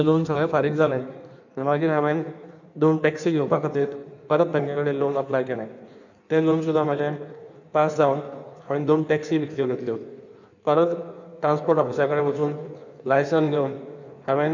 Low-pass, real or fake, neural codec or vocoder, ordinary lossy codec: 7.2 kHz; fake; codec, 16 kHz in and 24 kHz out, 1.1 kbps, FireRedTTS-2 codec; none